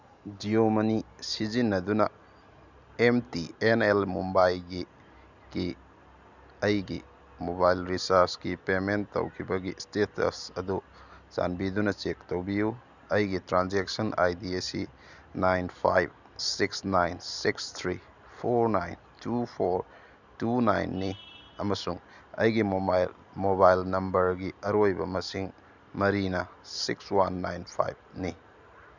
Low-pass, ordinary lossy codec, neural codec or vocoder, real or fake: 7.2 kHz; none; none; real